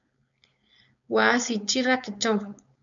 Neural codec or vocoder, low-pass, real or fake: codec, 16 kHz, 4.8 kbps, FACodec; 7.2 kHz; fake